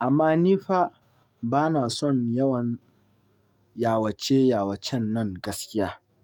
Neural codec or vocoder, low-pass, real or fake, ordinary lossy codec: codec, 44.1 kHz, 7.8 kbps, DAC; 19.8 kHz; fake; none